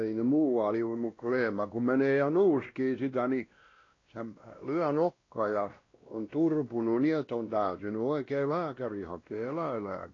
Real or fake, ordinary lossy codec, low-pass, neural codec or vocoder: fake; AAC, 32 kbps; 7.2 kHz; codec, 16 kHz, 1 kbps, X-Codec, WavLM features, trained on Multilingual LibriSpeech